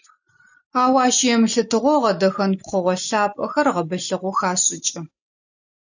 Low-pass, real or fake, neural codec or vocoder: 7.2 kHz; real; none